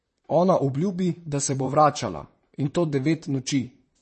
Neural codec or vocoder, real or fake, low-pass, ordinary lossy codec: vocoder, 44.1 kHz, 128 mel bands, Pupu-Vocoder; fake; 10.8 kHz; MP3, 32 kbps